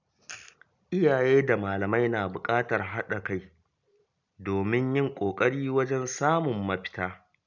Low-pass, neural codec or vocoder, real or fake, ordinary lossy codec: 7.2 kHz; none; real; none